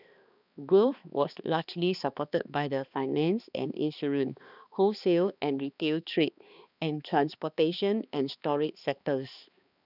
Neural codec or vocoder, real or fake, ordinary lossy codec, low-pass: codec, 16 kHz, 2 kbps, X-Codec, HuBERT features, trained on balanced general audio; fake; none; 5.4 kHz